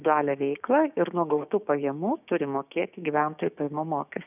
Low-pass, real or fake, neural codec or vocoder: 3.6 kHz; fake; codec, 16 kHz, 6 kbps, DAC